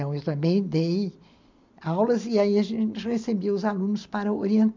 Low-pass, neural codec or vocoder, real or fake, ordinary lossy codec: 7.2 kHz; none; real; MP3, 64 kbps